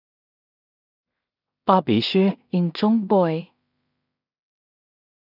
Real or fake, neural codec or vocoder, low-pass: fake; codec, 16 kHz in and 24 kHz out, 0.4 kbps, LongCat-Audio-Codec, two codebook decoder; 5.4 kHz